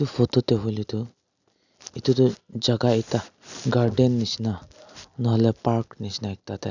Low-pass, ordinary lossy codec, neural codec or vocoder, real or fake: 7.2 kHz; none; none; real